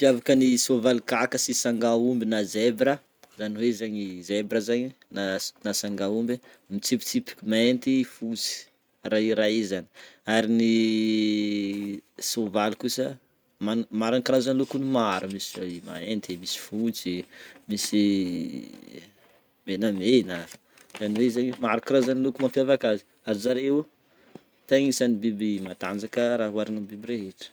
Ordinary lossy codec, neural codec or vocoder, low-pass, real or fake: none; none; none; real